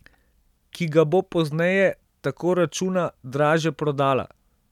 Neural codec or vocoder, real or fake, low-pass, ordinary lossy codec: none; real; 19.8 kHz; none